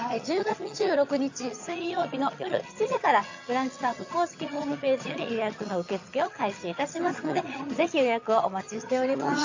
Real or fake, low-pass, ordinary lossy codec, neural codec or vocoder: fake; 7.2 kHz; AAC, 48 kbps; vocoder, 22.05 kHz, 80 mel bands, HiFi-GAN